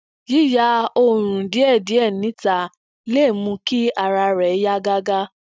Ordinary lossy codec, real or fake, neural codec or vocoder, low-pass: none; real; none; none